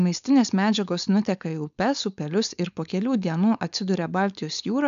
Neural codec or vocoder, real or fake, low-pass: codec, 16 kHz, 4.8 kbps, FACodec; fake; 7.2 kHz